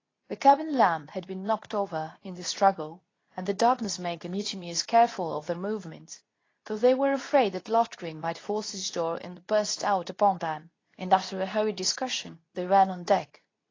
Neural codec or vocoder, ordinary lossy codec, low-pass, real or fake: codec, 24 kHz, 0.9 kbps, WavTokenizer, medium speech release version 2; AAC, 32 kbps; 7.2 kHz; fake